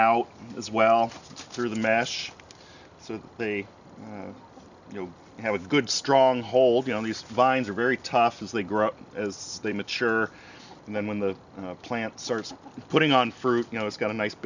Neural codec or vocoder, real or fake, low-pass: none; real; 7.2 kHz